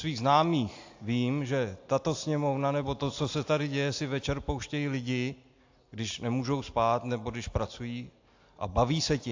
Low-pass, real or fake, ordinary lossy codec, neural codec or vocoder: 7.2 kHz; real; AAC, 48 kbps; none